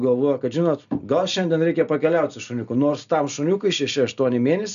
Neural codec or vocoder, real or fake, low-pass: none; real; 7.2 kHz